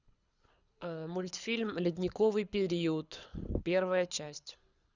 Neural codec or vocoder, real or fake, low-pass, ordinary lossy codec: codec, 24 kHz, 6 kbps, HILCodec; fake; 7.2 kHz; Opus, 64 kbps